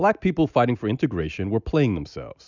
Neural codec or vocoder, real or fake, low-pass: none; real; 7.2 kHz